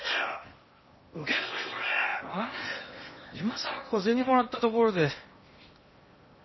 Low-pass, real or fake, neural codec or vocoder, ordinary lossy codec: 7.2 kHz; fake; codec, 16 kHz in and 24 kHz out, 0.8 kbps, FocalCodec, streaming, 65536 codes; MP3, 24 kbps